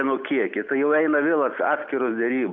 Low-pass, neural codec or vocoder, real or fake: 7.2 kHz; none; real